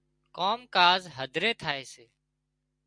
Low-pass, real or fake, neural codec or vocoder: 9.9 kHz; real; none